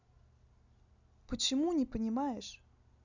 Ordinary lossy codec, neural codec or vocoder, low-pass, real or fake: none; none; 7.2 kHz; real